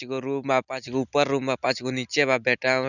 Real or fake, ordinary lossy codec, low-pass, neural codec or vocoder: real; none; 7.2 kHz; none